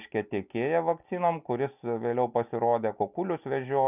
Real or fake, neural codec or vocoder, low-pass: real; none; 3.6 kHz